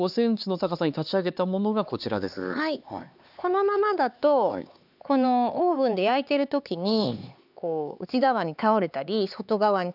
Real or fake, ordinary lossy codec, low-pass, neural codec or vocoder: fake; none; 5.4 kHz; codec, 16 kHz, 4 kbps, X-Codec, HuBERT features, trained on LibriSpeech